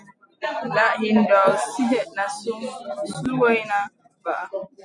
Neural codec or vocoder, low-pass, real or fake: none; 10.8 kHz; real